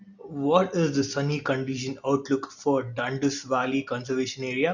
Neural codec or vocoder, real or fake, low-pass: none; real; 7.2 kHz